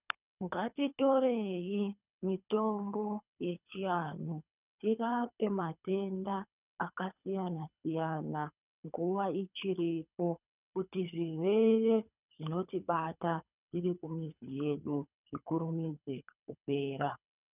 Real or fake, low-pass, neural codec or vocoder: fake; 3.6 kHz; codec, 24 kHz, 3 kbps, HILCodec